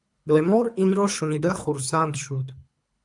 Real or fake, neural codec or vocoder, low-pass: fake; codec, 24 kHz, 3 kbps, HILCodec; 10.8 kHz